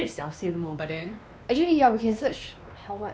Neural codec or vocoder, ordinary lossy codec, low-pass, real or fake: codec, 16 kHz, 2 kbps, X-Codec, WavLM features, trained on Multilingual LibriSpeech; none; none; fake